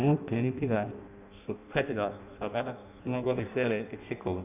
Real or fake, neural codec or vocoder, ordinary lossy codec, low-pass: fake; codec, 16 kHz in and 24 kHz out, 0.6 kbps, FireRedTTS-2 codec; none; 3.6 kHz